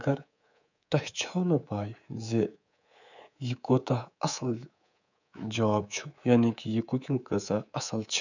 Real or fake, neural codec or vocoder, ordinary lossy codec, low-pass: fake; codec, 24 kHz, 3.1 kbps, DualCodec; none; 7.2 kHz